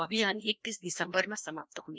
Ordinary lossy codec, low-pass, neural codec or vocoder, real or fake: none; none; codec, 16 kHz, 1 kbps, FreqCodec, larger model; fake